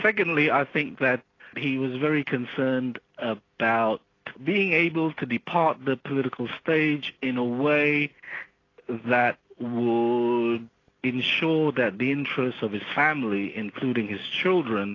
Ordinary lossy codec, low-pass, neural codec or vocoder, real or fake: AAC, 32 kbps; 7.2 kHz; none; real